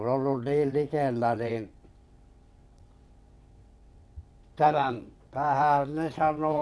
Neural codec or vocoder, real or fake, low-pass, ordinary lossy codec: vocoder, 22.05 kHz, 80 mel bands, WaveNeXt; fake; none; none